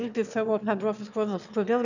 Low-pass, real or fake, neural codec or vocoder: 7.2 kHz; fake; autoencoder, 22.05 kHz, a latent of 192 numbers a frame, VITS, trained on one speaker